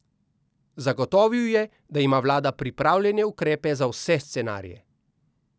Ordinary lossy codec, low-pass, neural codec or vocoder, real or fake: none; none; none; real